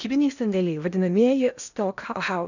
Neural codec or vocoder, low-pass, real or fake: codec, 16 kHz in and 24 kHz out, 0.8 kbps, FocalCodec, streaming, 65536 codes; 7.2 kHz; fake